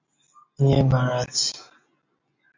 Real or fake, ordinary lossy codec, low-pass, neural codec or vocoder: real; MP3, 48 kbps; 7.2 kHz; none